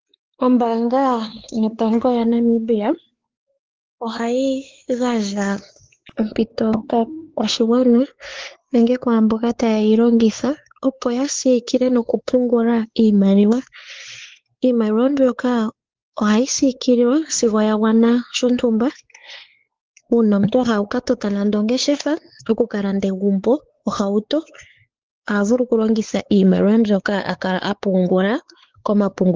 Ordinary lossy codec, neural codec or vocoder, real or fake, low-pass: Opus, 16 kbps; codec, 16 kHz, 4 kbps, X-Codec, WavLM features, trained on Multilingual LibriSpeech; fake; 7.2 kHz